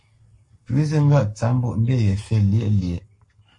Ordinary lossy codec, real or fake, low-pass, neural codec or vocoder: MP3, 48 kbps; fake; 10.8 kHz; codec, 44.1 kHz, 7.8 kbps, Pupu-Codec